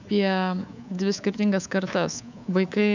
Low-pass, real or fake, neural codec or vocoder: 7.2 kHz; fake; codec, 24 kHz, 3.1 kbps, DualCodec